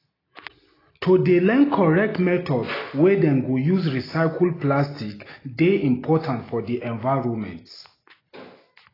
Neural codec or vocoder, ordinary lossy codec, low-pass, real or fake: none; AAC, 24 kbps; 5.4 kHz; real